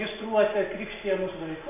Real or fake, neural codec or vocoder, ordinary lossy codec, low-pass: real; none; MP3, 32 kbps; 3.6 kHz